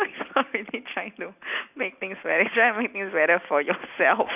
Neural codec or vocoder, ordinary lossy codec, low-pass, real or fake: none; none; 3.6 kHz; real